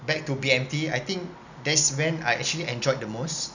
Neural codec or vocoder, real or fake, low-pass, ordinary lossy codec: none; real; 7.2 kHz; none